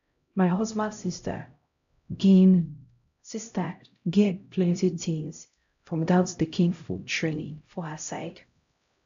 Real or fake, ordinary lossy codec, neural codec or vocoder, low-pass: fake; AAC, 64 kbps; codec, 16 kHz, 0.5 kbps, X-Codec, HuBERT features, trained on LibriSpeech; 7.2 kHz